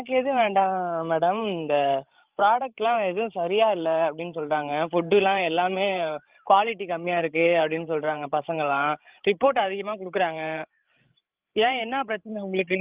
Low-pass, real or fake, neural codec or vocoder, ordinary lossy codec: 3.6 kHz; fake; codec, 16 kHz, 16 kbps, FreqCodec, larger model; Opus, 32 kbps